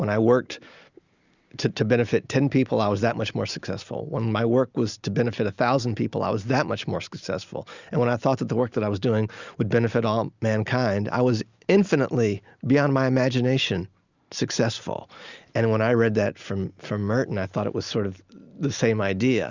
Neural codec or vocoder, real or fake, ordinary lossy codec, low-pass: none; real; Opus, 64 kbps; 7.2 kHz